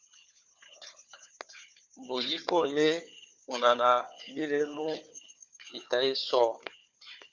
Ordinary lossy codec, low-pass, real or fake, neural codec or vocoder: MP3, 64 kbps; 7.2 kHz; fake; codec, 16 kHz, 4 kbps, FunCodec, trained on Chinese and English, 50 frames a second